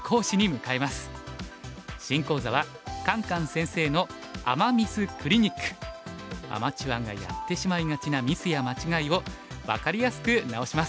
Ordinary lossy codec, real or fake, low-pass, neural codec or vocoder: none; real; none; none